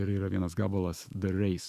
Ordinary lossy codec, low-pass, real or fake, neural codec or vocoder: Opus, 64 kbps; 14.4 kHz; fake; autoencoder, 48 kHz, 128 numbers a frame, DAC-VAE, trained on Japanese speech